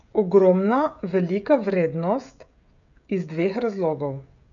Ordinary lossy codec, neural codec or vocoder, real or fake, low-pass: none; codec, 16 kHz, 16 kbps, FreqCodec, smaller model; fake; 7.2 kHz